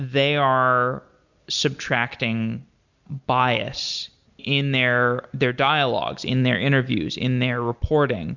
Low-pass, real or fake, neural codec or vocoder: 7.2 kHz; real; none